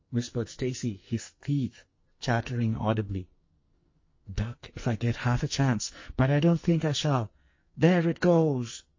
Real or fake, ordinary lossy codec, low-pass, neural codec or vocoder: fake; MP3, 32 kbps; 7.2 kHz; codec, 44.1 kHz, 2.6 kbps, SNAC